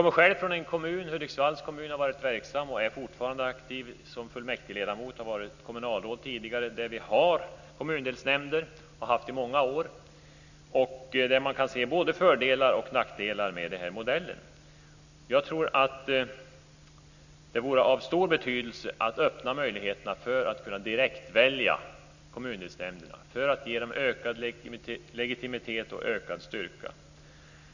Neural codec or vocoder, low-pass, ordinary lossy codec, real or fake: none; 7.2 kHz; none; real